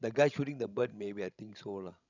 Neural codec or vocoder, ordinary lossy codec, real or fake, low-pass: codec, 16 kHz, 16 kbps, FreqCodec, larger model; none; fake; 7.2 kHz